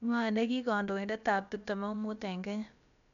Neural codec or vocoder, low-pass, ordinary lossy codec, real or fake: codec, 16 kHz, about 1 kbps, DyCAST, with the encoder's durations; 7.2 kHz; none; fake